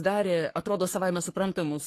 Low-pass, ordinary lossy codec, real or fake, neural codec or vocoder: 14.4 kHz; AAC, 48 kbps; fake; codec, 44.1 kHz, 3.4 kbps, Pupu-Codec